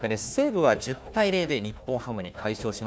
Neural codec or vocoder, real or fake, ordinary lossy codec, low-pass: codec, 16 kHz, 1 kbps, FunCodec, trained on Chinese and English, 50 frames a second; fake; none; none